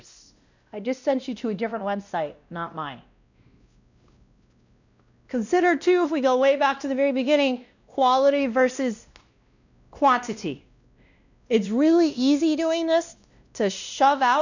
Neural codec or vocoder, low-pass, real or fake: codec, 16 kHz, 1 kbps, X-Codec, WavLM features, trained on Multilingual LibriSpeech; 7.2 kHz; fake